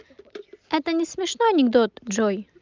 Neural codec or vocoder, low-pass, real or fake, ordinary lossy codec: none; 7.2 kHz; real; Opus, 24 kbps